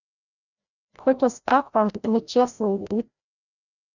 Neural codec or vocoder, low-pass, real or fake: codec, 16 kHz, 0.5 kbps, FreqCodec, larger model; 7.2 kHz; fake